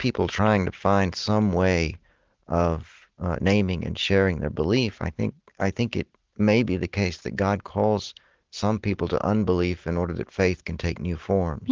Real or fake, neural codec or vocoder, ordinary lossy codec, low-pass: real; none; Opus, 16 kbps; 7.2 kHz